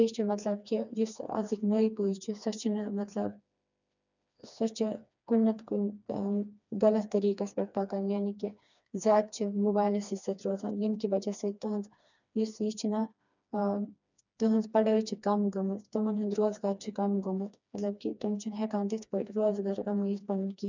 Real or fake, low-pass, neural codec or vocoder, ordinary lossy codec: fake; 7.2 kHz; codec, 16 kHz, 2 kbps, FreqCodec, smaller model; none